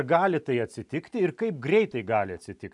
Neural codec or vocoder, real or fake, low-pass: none; real; 10.8 kHz